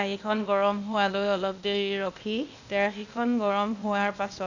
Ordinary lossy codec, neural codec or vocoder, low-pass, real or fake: none; codec, 16 kHz, 0.8 kbps, ZipCodec; 7.2 kHz; fake